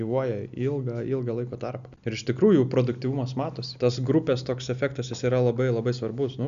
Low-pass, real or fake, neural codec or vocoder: 7.2 kHz; real; none